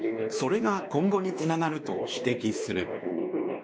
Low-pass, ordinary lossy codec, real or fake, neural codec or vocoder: none; none; fake; codec, 16 kHz, 2 kbps, X-Codec, WavLM features, trained on Multilingual LibriSpeech